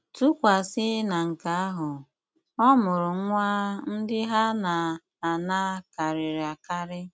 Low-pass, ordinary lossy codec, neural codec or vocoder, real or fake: none; none; none; real